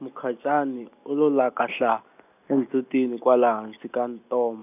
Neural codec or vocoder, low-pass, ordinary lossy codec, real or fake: none; 3.6 kHz; none; real